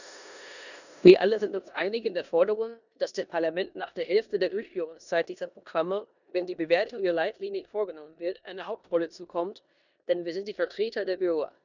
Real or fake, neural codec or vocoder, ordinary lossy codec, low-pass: fake; codec, 16 kHz in and 24 kHz out, 0.9 kbps, LongCat-Audio-Codec, four codebook decoder; none; 7.2 kHz